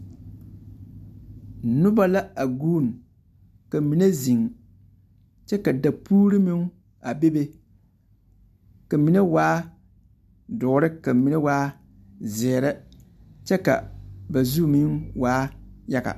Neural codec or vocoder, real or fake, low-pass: none; real; 14.4 kHz